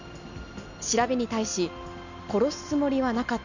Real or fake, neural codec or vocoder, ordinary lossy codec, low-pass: real; none; none; 7.2 kHz